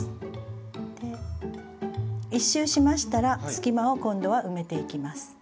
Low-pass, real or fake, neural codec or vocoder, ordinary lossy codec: none; real; none; none